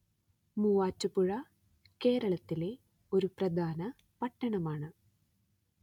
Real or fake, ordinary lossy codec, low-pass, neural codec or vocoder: real; none; 19.8 kHz; none